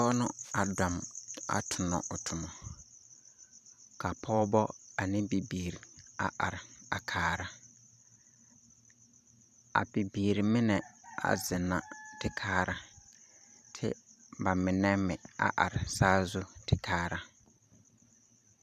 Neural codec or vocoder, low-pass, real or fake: vocoder, 44.1 kHz, 128 mel bands every 512 samples, BigVGAN v2; 14.4 kHz; fake